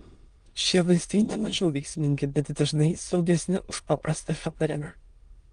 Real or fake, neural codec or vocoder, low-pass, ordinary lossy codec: fake; autoencoder, 22.05 kHz, a latent of 192 numbers a frame, VITS, trained on many speakers; 9.9 kHz; Opus, 32 kbps